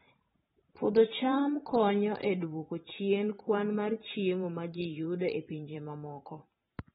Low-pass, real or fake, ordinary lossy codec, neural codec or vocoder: 19.8 kHz; fake; AAC, 16 kbps; vocoder, 44.1 kHz, 128 mel bands every 512 samples, BigVGAN v2